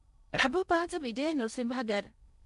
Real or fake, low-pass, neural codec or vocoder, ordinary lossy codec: fake; 10.8 kHz; codec, 16 kHz in and 24 kHz out, 0.8 kbps, FocalCodec, streaming, 65536 codes; none